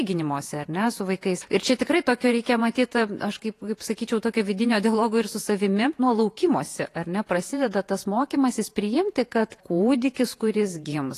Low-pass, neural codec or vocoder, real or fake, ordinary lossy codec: 14.4 kHz; vocoder, 48 kHz, 128 mel bands, Vocos; fake; AAC, 48 kbps